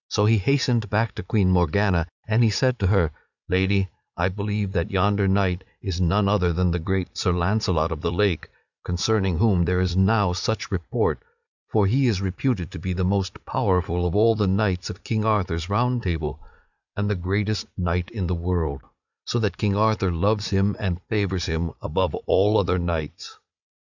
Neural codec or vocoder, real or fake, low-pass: vocoder, 44.1 kHz, 80 mel bands, Vocos; fake; 7.2 kHz